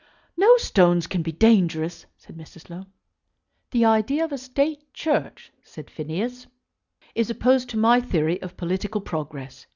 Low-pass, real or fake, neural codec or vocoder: 7.2 kHz; real; none